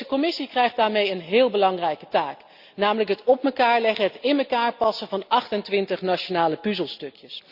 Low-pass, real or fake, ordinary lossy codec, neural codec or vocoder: 5.4 kHz; real; Opus, 64 kbps; none